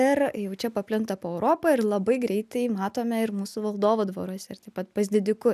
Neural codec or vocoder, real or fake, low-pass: none; real; 14.4 kHz